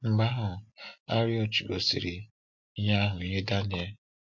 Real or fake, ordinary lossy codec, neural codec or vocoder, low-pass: real; MP3, 48 kbps; none; 7.2 kHz